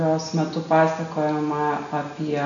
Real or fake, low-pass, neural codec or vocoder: real; 7.2 kHz; none